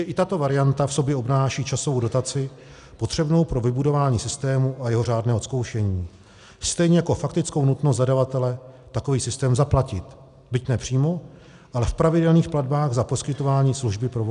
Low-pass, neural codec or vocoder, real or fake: 10.8 kHz; none; real